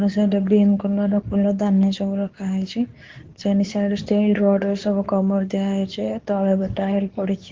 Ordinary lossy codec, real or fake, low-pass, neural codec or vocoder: Opus, 16 kbps; fake; 7.2 kHz; codec, 24 kHz, 0.9 kbps, WavTokenizer, medium speech release version 2